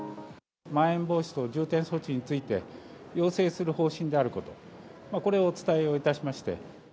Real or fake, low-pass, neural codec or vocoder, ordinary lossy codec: real; none; none; none